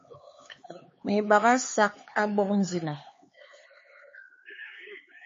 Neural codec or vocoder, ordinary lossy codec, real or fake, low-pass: codec, 16 kHz, 4 kbps, X-Codec, HuBERT features, trained on LibriSpeech; MP3, 32 kbps; fake; 7.2 kHz